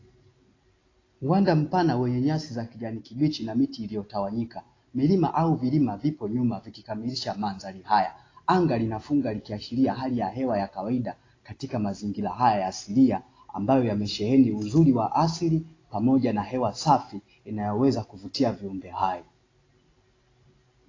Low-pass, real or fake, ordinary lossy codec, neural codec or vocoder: 7.2 kHz; real; AAC, 32 kbps; none